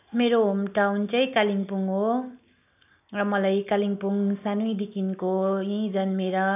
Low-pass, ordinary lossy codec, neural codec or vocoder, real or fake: 3.6 kHz; none; none; real